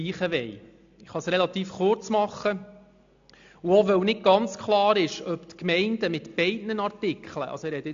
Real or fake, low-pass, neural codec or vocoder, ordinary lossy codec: real; 7.2 kHz; none; AAC, 64 kbps